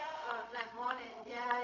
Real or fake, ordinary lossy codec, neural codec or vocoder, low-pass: fake; AAC, 32 kbps; codec, 16 kHz, 0.4 kbps, LongCat-Audio-Codec; 7.2 kHz